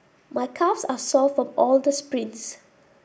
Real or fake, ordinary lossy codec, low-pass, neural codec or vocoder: real; none; none; none